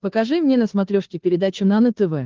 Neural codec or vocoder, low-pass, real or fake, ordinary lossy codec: codec, 16 kHz, 2 kbps, FunCodec, trained on Chinese and English, 25 frames a second; 7.2 kHz; fake; Opus, 16 kbps